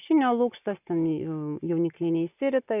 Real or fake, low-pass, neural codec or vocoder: real; 3.6 kHz; none